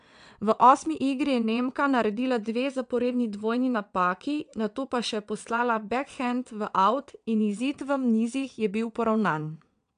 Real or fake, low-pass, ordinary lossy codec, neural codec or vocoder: fake; 9.9 kHz; none; vocoder, 22.05 kHz, 80 mel bands, WaveNeXt